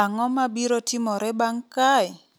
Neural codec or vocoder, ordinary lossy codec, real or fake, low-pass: none; none; real; none